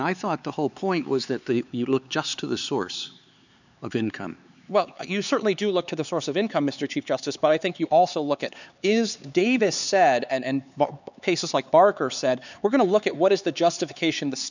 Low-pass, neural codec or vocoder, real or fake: 7.2 kHz; codec, 16 kHz, 4 kbps, X-Codec, HuBERT features, trained on LibriSpeech; fake